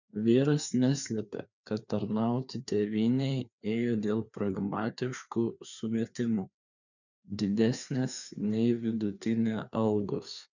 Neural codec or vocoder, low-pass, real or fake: codec, 16 kHz, 2 kbps, FreqCodec, larger model; 7.2 kHz; fake